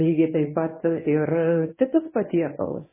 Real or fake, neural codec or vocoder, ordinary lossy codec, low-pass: fake; codec, 24 kHz, 0.9 kbps, WavTokenizer, medium speech release version 1; MP3, 16 kbps; 3.6 kHz